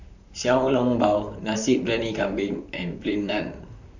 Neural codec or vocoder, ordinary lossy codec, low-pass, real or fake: vocoder, 44.1 kHz, 128 mel bands, Pupu-Vocoder; none; 7.2 kHz; fake